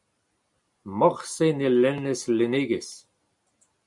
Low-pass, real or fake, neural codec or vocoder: 10.8 kHz; real; none